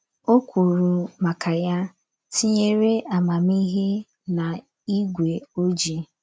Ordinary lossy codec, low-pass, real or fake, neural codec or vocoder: none; none; real; none